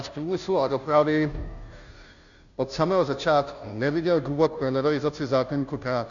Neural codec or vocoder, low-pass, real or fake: codec, 16 kHz, 0.5 kbps, FunCodec, trained on Chinese and English, 25 frames a second; 7.2 kHz; fake